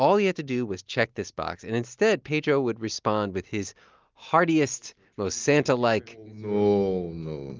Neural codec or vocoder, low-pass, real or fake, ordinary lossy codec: none; 7.2 kHz; real; Opus, 24 kbps